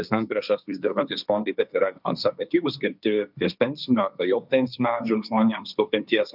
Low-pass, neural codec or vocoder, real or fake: 5.4 kHz; codec, 16 kHz, 1.1 kbps, Voila-Tokenizer; fake